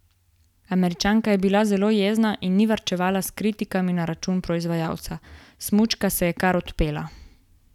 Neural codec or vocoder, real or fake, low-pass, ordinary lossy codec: none; real; 19.8 kHz; none